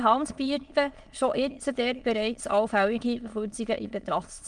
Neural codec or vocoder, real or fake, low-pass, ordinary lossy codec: autoencoder, 22.05 kHz, a latent of 192 numbers a frame, VITS, trained on many speakers; fake; 9.9 kHz; Opus, 32 kbps